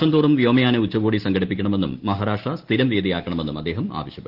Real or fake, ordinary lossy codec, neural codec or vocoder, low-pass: real; Opus, 16 kbps; none; 5.4 kHz